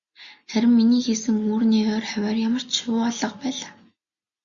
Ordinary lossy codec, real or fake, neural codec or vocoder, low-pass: Opus, 64 kbps; real; none; 7.2 kHz